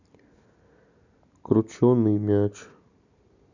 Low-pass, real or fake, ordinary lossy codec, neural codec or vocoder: 7.2 kHz; real; none; none